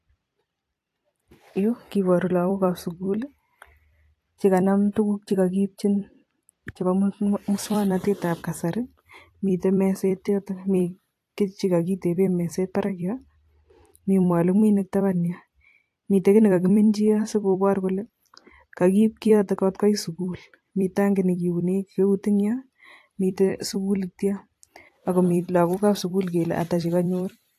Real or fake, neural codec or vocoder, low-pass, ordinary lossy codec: fake; vocoder, 44.1 kHz, 128 mel bands every 256 samples, BigVGAN v2; 14.4 kHz; AAC, 64 kbps